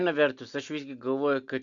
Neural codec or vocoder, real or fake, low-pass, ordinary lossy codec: none; real; 7.2 kHz; Opus, 64 kbps